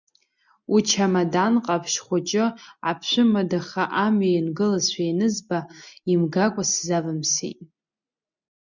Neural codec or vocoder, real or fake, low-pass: none; real; 7.2 kHz